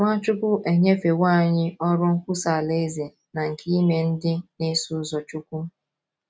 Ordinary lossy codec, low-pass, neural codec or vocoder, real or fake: none; none; none; real